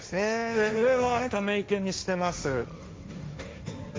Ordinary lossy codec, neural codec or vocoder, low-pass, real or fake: none; codec, 16 kHz, 1.1 kbps, Voila-Tokenizer; none; fake